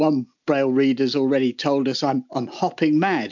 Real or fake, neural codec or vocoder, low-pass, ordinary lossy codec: real; none; 7.2 kHz; MP3, 64 kbps